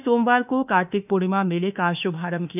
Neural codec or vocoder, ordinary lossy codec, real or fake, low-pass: autoencoder, 48 kHz, 32 numbers a frame, DAC-VAE, trained on Japanese speech; none; fake; 3.6 kHz